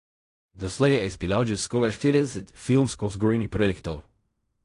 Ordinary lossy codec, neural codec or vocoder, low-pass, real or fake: AAC, 48 kbps; codec, 16 kHz in and 24 kHz out, 0.4 kbps, LongCat-Audio-Codec, fine tuned four codebook decoder; 10.8 kHz; fake